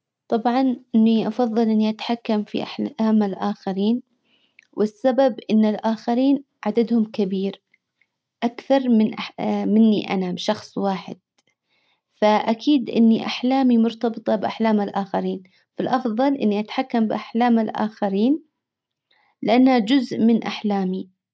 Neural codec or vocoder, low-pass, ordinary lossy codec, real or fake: none; none; none; real